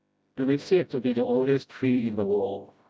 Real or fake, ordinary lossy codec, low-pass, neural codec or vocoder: fake; none; none; codec, 16 kHz, 0.5 kbps, FreqCodec, smaller model